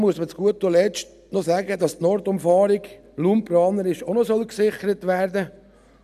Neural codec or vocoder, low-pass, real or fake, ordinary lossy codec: none; 14.4 kHz; real; none